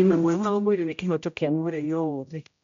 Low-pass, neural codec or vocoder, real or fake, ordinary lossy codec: 7.2 kHz; codec, 16 kHz, 0.5 kbps, X-Codec, HuBERT features, trained on general audio; fake; MP3, 64 kbps